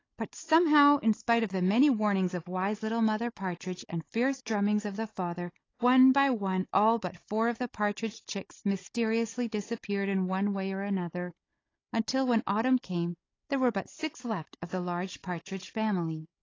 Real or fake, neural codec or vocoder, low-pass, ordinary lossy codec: fake; codec, 16 kHz, 16 kbps, FunCodec, trained on Chinese and English, 50 frames a second; 7.2 kHz; AAC, 32 kbps